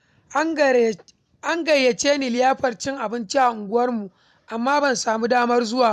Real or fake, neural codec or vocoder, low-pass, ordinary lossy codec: fake; vocoder, 44.1 kHz, 128 mel bands every 256 samples, BigVGAN v2; 14.4 kHz; none